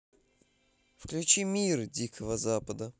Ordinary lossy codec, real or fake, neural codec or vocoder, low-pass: none; real; none; none